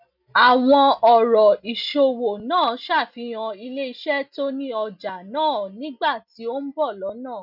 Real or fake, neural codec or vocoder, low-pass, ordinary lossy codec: real; none; 5.4 kHz; none